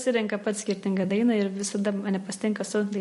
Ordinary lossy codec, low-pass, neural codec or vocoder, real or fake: MP3, 48 kbps; 14.4 kHz; vocoder, 44.1 kHz, 128 mel bands every 256 samples, BigVGAN v2; fake